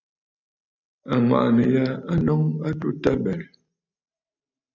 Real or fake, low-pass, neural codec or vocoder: real; 7.2 kHz; none